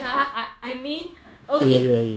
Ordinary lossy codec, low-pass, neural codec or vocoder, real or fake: none; none; codec, 16 kHz, 0.9 kbps, LongCat-Audio-Codec; fake